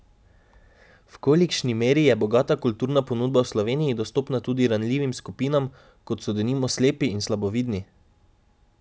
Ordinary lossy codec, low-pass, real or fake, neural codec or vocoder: none; none; real; none